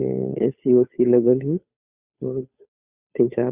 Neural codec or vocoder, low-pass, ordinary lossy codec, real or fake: codec, 16 kHz, 2 kbps, FunCodec, trained on Chinese and English, 25 frames a second; 3.6 kHz; Opus, 64 kbps; fake